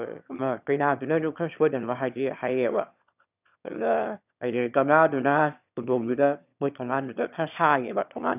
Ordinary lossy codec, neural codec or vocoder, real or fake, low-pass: none; autoencoder, 22.05 kHz, a latent of 192 numbers a frame, VITS, trained on one speaker; fake; 3.6 kHz